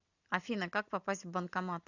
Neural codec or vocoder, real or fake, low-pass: none; real; 7.2 kHz